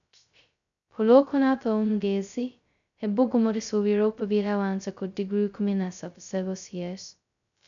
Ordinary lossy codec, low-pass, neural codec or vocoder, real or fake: none; 7.2 kHz; codec, 16 kHz, 0.2 kbps, FocalCodec; fake